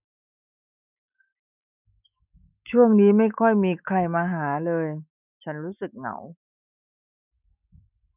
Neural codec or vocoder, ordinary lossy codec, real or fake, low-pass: autoencoder, 48 kHz, 128 numbers a frame, DAC-VAE, trained on Japanese speech; none; fake; 3.6 kHz